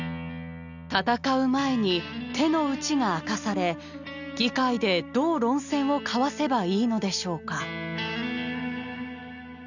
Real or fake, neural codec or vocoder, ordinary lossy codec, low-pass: real; none; none; 7.2 kHz